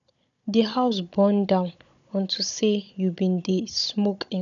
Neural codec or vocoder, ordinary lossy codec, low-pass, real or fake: codec, 16 kHz, 16 kbps, FunCodec, trained on Chinese and English, 50 frames a second; none; 7.2 kHz; fake